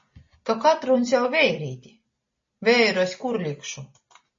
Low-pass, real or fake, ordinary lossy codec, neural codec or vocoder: 7.2 kHz; real; MP3, 32 kbps; none